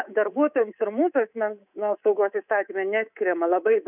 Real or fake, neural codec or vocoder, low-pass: fake; codec, 24 kHz, 3.1 kbps, DualCodec; 3.6 kHz